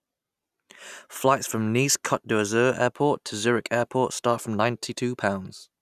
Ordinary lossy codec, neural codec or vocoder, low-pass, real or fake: none; none; 14.4 kHz; real